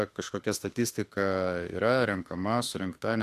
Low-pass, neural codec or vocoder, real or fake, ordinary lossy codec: 14.4 kHz; autoencoder, 48 kHz, 32 numbers a frame, DAC-VAE, trained on Japanese speech; fake; AAC, 64 kbps